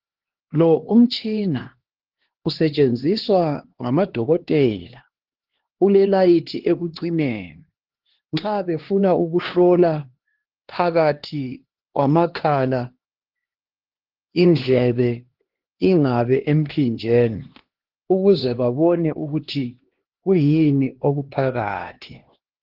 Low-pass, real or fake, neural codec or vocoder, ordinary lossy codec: 5.4 kHz; fake; codec, 16 kHz, 2 kbps, X-Codec, HuBERT features, trained on LibriSpeech; Opus, 16 kbps